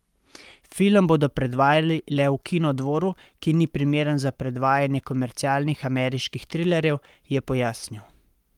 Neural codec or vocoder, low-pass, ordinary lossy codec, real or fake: codec, 44.1 kHz, 7.8 kbps, Pupu-Codec; 19.8 kHz; Opus, 32 kbps; fake